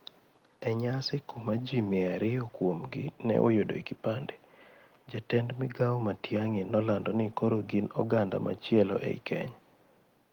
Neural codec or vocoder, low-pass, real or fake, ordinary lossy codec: none; 19.8 kHz; real; Opus, 24 kbps